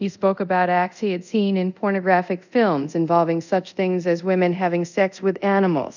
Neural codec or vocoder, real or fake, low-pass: codec, 24 kHz, 0.5 kbps, DualCodec; fake; 7.2 kHz